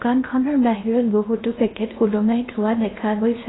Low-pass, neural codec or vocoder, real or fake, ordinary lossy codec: 7.2 kHz; codec, 16 kHz in and 24 kHz out, 0.8 kbps, FocalCodec, streaming, 65536 codes; fake; AAC, 16 kbps